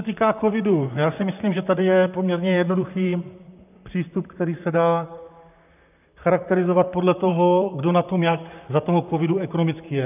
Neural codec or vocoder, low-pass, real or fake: vocoder, 44.1 kHz, 128 mel bands, Pupu-Vocoder; 3.6 kHz; fake